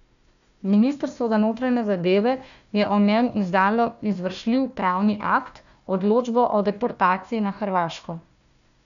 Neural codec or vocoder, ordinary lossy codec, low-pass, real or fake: codec, 16 kHz, 1 kbps, FunCodec, trained on Chinese and English, 50 frames a second; none; 7.2 kHz; fake